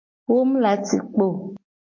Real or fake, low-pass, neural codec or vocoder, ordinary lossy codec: real; 7.2 kHz; none; MP3, 32 kbps